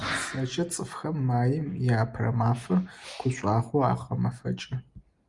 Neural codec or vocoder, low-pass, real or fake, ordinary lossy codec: none; 10.8 kHz; real; Opus, 32 kbps